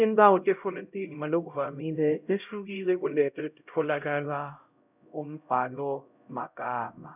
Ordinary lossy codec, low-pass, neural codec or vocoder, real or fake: none; 3.6 kHz; codec, 16 kHz, 0.5 kbps, X-Codec, HuBERT features, trained on LibriSpeech; fake